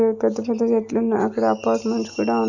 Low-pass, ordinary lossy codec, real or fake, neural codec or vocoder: 7.2 kHz; none; real; none